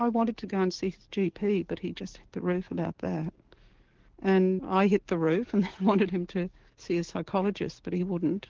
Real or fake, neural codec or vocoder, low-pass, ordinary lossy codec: fake; codec, 44.1 kHz, 7.8 kbps, DAC; 7.2 kHz; Opus, 16 kbps